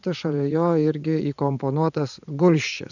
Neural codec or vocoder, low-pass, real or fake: vocoder, 44.1 kHz, 80 mel bands, Vocos; 7.2 kHz; fake